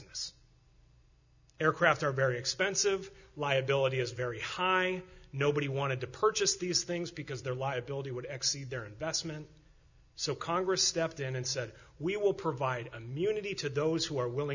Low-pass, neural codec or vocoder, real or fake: 7.2 kHz; none; real